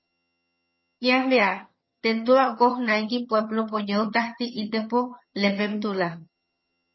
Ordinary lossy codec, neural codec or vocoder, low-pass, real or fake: MP3, 24 kbps; vocoder, 22.05 kHz, 80 mel bands, HiFi-GAN; 7.2 kHz; fake